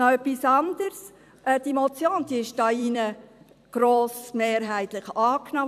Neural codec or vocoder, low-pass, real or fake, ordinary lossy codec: vocoder, 44.1 kHz, 128 mel bands every 256 samples, BigVGAN v2; 14.4 kHz; fake; none